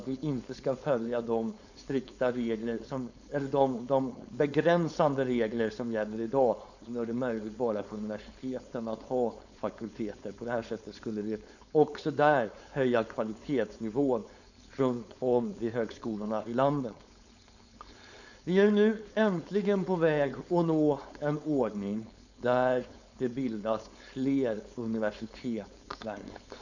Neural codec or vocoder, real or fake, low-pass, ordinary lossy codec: codec, 16 kHz, 4.8 kbps, FACodec; fake; 7.2 kHz; none